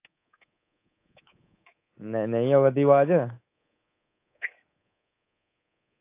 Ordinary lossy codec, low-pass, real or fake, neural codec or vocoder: AAC, 24 kbps; 3.6 kHz; fake; codec, 24 kHz, 3.1 kbps, DualCodec